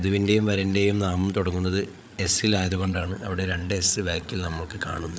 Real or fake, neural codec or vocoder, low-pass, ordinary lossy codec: fake; codec, 16 kHz, 8 kbps, FreqCodec, larger model; none; none